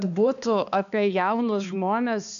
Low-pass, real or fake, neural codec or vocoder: 7.2 kHz; fake; codec, 16 kHz, 2 kbps, X-Codec, HuBERT features, trained on balanced general audio